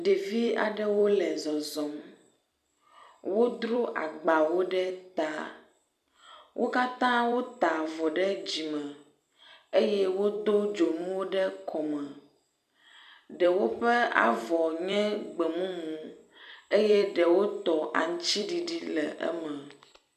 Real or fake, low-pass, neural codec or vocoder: fake; 14.4 kHz; vocoder, 44.1 kHz, 128 mel bands every 256 samples, BigVGAN v2